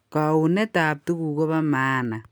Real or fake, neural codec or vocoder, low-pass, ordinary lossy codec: real; none; none; none